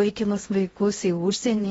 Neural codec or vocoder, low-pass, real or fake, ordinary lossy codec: codec, 16 kHz in and 24 kHz out, 0.8 kbps, FocalCodec, streaming, 65536 codes; 10.8 kHz; fake; AAC, 24 kbps